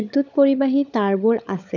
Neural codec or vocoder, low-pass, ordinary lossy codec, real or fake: codec, 16 kHz, 16 kbps, FunCodec, trained on LibriTTS, 50 frames a second; 7.2 kHz; none; fake